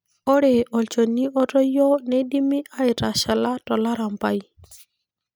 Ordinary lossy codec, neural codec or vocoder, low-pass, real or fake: none; none; none; real